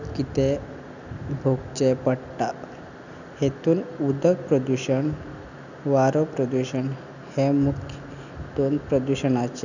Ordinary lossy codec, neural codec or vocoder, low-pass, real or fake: none; none; 7.2 kHz; real